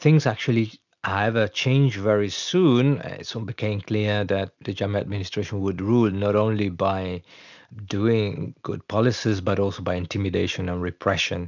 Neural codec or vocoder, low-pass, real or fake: none; 7.2 kHz; real